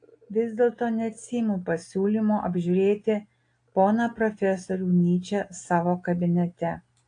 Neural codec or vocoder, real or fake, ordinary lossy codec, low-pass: none; real; AAC, 48 kbps; 9.9 kHz